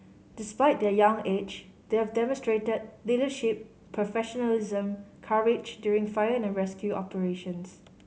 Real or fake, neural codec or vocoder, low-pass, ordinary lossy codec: real; none; none; none